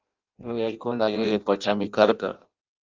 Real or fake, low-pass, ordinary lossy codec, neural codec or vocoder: fake; 7.2 kHz; Opus, 32 kbps; codec, 16 kHz in and 24 kHz out, 0.6 kbps, FireRedTTS-2 codec